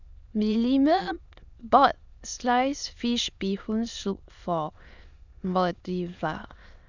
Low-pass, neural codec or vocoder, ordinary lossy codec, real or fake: 7.2 kHz; autoencoder, 22.05 kHz, a latent of 192 numbers a frame, VITS, trained on many speakers; none; fake